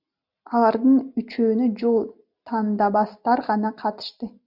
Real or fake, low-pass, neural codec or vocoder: real; 5.4 kHz; none